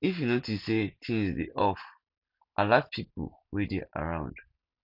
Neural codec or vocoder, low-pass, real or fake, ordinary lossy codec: none; 5.4 kHz; real; none